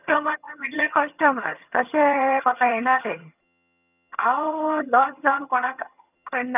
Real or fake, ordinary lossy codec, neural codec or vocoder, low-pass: fake; none; vocoder, 22.05 kHz, 80 mel bands, HiFi-GAN; 3.6 kHz